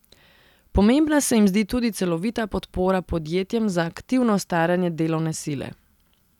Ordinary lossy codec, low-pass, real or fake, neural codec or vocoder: none; 19.8 kHz; real; none